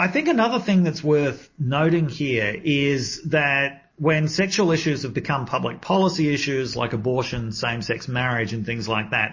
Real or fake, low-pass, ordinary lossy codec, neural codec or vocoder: real; 7.2 kHz; MP3, 32 kbps; none